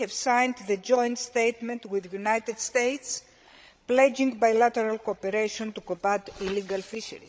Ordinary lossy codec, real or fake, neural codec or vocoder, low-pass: none; fake; codec, 16 kHz, 16 kbps, FreqCodec, larger model; none